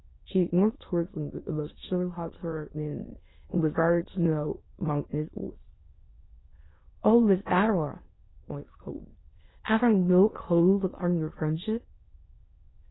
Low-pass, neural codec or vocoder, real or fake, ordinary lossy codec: 7.2 kHz; autoencoder, 22.05 kHz, a latent of 192 numbers a frame, VITS, trained on many speakers; fake; AAC, 16 kbps